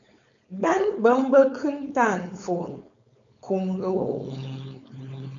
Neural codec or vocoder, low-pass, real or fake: codec, 16 kHz, 4.8 kbps, FACodec; 7.2 kHz; fake